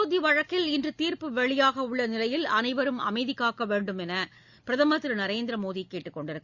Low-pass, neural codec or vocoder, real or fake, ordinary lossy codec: 7.2 kHz; none; real; Opus, 64 kbps